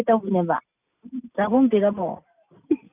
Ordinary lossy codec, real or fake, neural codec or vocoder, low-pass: none; real; none; 3.6 kHz